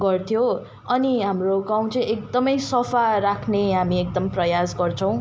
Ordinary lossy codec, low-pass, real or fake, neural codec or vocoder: none; none; real; none